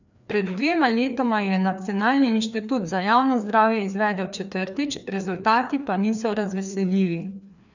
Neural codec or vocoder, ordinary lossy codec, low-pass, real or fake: codec, 16 kHz, 2 kbps, FreqCodec, larger model; none; 7.2 kHz; fake